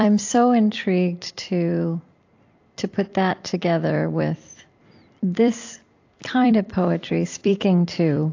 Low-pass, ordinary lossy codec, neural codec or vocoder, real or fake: 7.2 kHz; AAC, 48 kbps; vocoder, 44.1 kHz, 128 mel bands every 256 samples, BigVGAN v2; fake